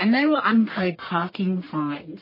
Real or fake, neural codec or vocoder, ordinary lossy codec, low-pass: fake; codec, 44.1 kHz, 1.7 kbps, Pupu-Codec; MP3, 24 kbps; 5.4 kHz